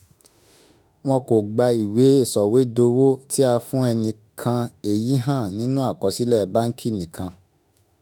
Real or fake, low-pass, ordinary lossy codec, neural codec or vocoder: fake; none; none; autoencoder, 48 kHz, 32 numbers a frame, DAC-VAE, trained on Japanese speech